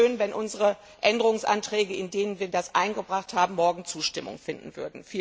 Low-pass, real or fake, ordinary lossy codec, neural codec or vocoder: none; real; none; none